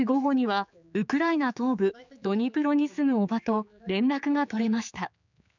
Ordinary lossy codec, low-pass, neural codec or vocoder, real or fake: none; 7.2 kHz; codec, 16 kHz, 4 kbps, X-Codec, HuBERT features, trained on general audio; fake